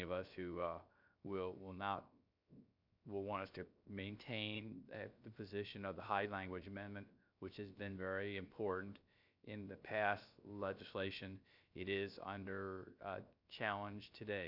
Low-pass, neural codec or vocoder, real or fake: 5.4 kHz; codec, 16 kHz, 0.7 kbps, FocalCodec; fake